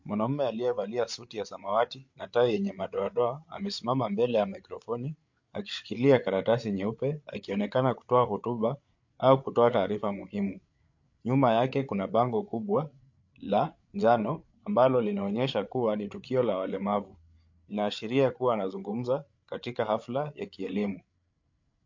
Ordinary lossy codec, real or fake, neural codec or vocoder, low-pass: MP3, 48 kbps; fake; codec, 16 kHz, 8 kbps, FreqCodec, larger model; 7.2 kHz